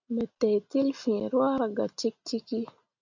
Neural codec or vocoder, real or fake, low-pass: none; real; 7.2 kHz